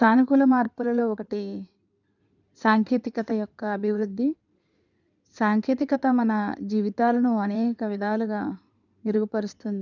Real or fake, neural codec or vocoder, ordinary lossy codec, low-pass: fake; codec, 16 kHz in and 24 kHz out, 2.2 kbps, FireRedTTS-2 codec; none; 7.2 kHz